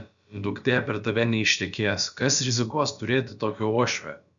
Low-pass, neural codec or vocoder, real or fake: 7.2 kHz; codec, 16 kHz, about 1 kbps, DyCAST, with the encoder's durations; fake